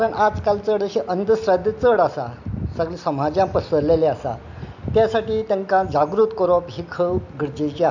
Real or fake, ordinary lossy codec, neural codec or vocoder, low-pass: real; none; none; 7.2 kHz